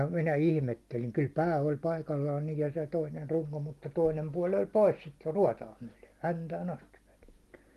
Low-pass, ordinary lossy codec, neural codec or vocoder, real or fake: 14.4 kHz; Opus, 24 kbps; none; real